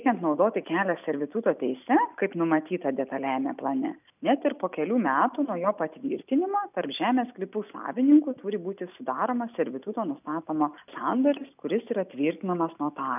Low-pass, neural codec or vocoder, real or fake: 3.6 kHz; none; real